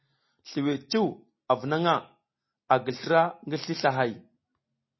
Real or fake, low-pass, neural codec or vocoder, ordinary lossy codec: real; 7.2 kHz; none; MP3, 24 kbps